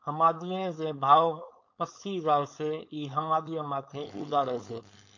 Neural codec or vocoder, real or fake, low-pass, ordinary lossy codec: codec, 16 kHz, 4.8 kbps, FACodec; fake; 7.2 kHz; MP3, 48 kbps